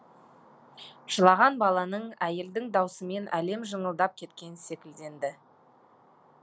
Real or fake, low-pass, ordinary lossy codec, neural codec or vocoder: real; none; none; none